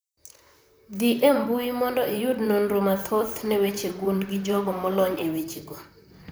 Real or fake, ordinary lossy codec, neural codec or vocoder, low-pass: fake; none; vocoder, 44.1 kHz, 128 mel bands, Pupu-Vocoder; none